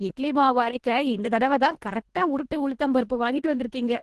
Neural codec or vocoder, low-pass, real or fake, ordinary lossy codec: codec, 24 kHz, 1.5 kbps, HILCodec; 10.8 kHz; fake; Opus, 16 kbps